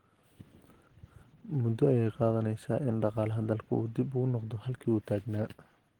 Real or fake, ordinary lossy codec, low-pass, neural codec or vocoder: real; Opus, 16 kbps; 19.8 kHz; none